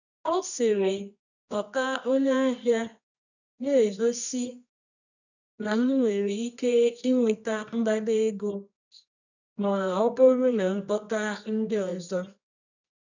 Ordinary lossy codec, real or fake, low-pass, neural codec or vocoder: none; fake; 7.2 kHz; codec, 24 kHz, 0.9 kbps, WavTokenizer, medium music audio release